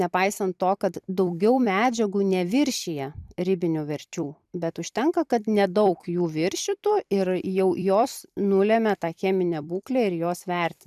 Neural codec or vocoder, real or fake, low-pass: vocoder, 44.1 kHz, 128 mel bands every 256 samples, BigVGAN v2; fake; 14.4 kHz